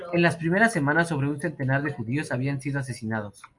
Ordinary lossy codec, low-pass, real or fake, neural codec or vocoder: MP3, 96 kbps; 10.8 kHz; real; none